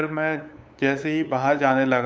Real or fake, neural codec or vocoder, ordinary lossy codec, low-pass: fake; codec, 16 kHz, 8 kbps, FunCodec, trained on LibriTTS, 25 frames a second; none; none